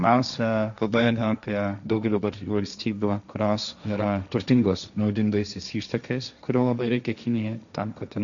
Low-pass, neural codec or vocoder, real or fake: 7.2 kHz; codec, 16 kHz, 1.1 kbps, Voila-Tokenizer; fake